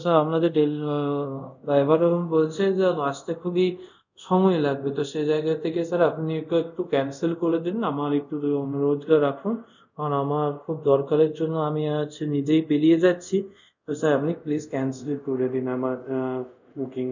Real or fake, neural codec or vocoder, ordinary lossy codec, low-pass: fake; codec, 24 kHz, 0.5 kbps, DualCodec; none; 7.2 kHz